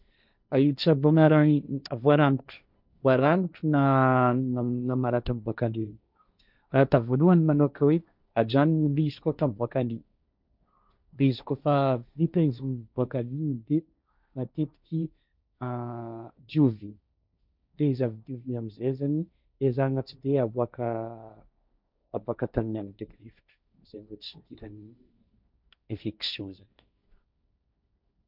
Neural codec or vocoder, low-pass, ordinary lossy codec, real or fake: codec, 16 kHz, 1.1 kbps, Voila-Tokenizer; 5.4 kHz; none; fake